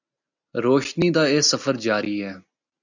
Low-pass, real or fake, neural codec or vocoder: 7.2 kHz; real; none